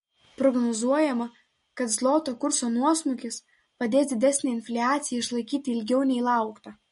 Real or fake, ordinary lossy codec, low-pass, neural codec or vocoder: real; MP3, 48 kbps; 10.8 kHz; none